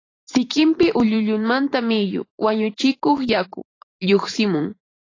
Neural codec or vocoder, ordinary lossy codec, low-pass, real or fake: vocoder, 44.1 kHz, 128 mel bands every 512 samples, BigVGAN v2; AAC, 32 kbps; 7.2 kHz; fake